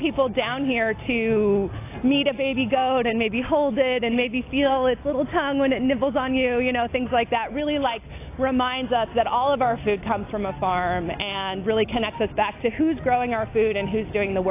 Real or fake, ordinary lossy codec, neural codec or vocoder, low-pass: real; AAC, 24 kbps; none; 3.6 kHz